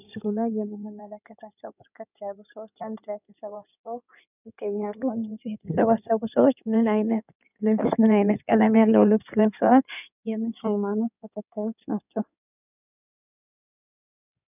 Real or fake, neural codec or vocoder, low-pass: fake; codec, 16 kHz in and 24 kHz out, 2.2 kbps, FireRedTTS-2 codec; 3.6 kHz